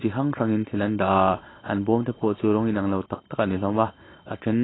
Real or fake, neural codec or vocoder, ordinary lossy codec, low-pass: real; none; AAC, 16 kbps; 7.2 kHz